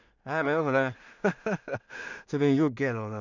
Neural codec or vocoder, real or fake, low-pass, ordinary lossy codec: codec, 16 kHz in and 24 kHz out, 0.4 kbps, LongCat-Audio-Codec, two codebook decoder; fake; 7.2 kHz; none